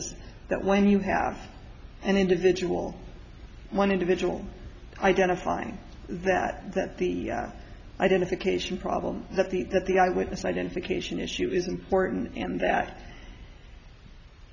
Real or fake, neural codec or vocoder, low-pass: real; none; 7.2 kHz